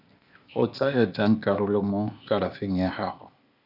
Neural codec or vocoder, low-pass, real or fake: codec, 16 kHz, 0.8 kbps, ZipCodec; 5.4 kHz; fake